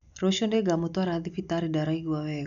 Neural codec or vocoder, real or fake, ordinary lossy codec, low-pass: none; real; none; 7.2 kHz